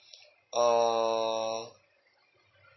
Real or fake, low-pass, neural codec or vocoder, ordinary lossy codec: real; 7.2 kHz; none; MP3, 24 kbps